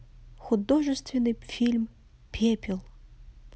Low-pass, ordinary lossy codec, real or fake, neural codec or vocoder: none; none; real; none